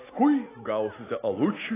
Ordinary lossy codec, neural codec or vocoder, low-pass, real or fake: AAC, 16 kbps; none; 3.6 kHz; real